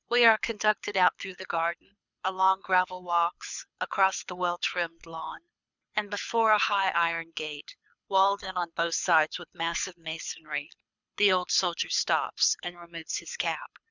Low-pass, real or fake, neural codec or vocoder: 7.2 kHz; fake; codec, 24 kHz, 6 kbps, HILCodec